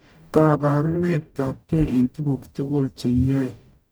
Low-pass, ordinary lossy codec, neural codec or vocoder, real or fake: none; none; codec, 44.1 kHz, 0.9 kbps, DAC; fake